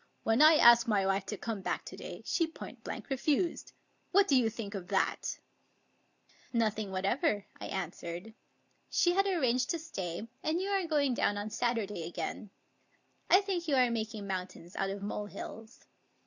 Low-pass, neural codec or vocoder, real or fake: 7.2 kHz; none; real